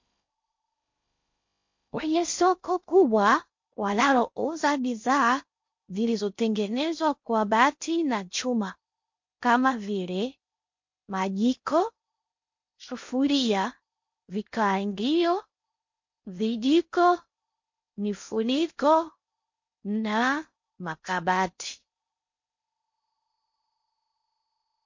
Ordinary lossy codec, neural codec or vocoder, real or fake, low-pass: MP3, 48 kbps; codec, 16 kHz in and 24 kHz out, 0.6 kbps, FocalCodec, streaming, 4096 codes; fake; 7.2 kHz